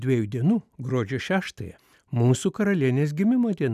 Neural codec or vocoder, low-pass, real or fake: none; 14.4 kHz; real